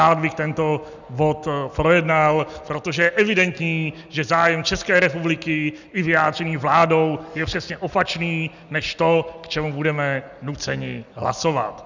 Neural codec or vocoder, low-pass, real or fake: none; 7.2 kHz; real